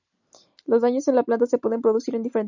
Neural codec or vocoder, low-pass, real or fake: none; 7.2 kHz; real